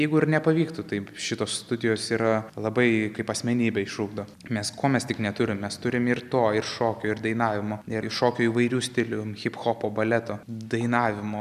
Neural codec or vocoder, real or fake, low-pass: none; real; 14.4 kHz